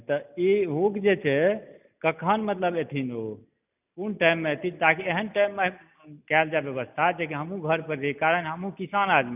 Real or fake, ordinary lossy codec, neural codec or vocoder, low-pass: real; none; none; 3.6 kHz